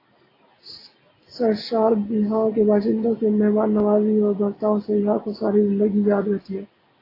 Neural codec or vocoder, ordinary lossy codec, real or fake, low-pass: none; AAC, 24 kbps; real; 5.4 kHz